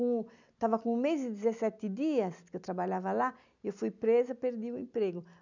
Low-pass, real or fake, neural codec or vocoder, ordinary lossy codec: 7.2 kHz; real; none; none